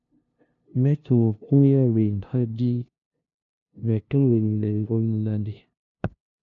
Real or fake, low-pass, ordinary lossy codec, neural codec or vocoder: fake; 7.2 kHz; MP3, 96 kbps; codec, 16 kHz, 0.5 kbps, FunCodec, trained on LibriTTS, 25 frames a second